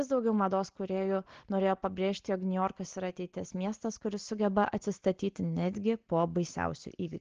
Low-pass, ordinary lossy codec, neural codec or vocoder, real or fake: 7.2 kHz; Opus, 16 kbps; none; real